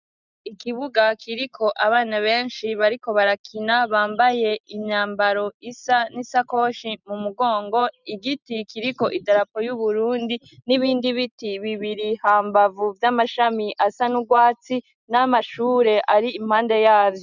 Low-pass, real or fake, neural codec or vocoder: 7.2 kHz; real; none